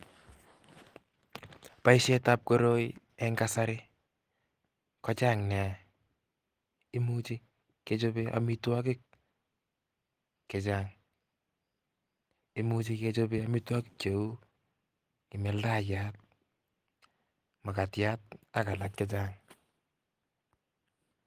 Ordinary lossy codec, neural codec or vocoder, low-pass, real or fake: Opus, 24 kbps; none; 14.4 kHz; real